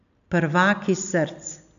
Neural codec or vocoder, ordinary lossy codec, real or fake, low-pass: none; none; real; 7.2 kHz